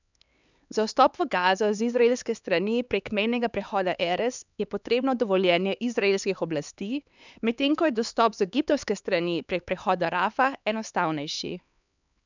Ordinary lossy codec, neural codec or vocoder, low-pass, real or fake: none; codec, 16 kHz, 4 kbps, X-Codec, HuBERT features, trained on LibriSpeech; 7.2 kHz; fake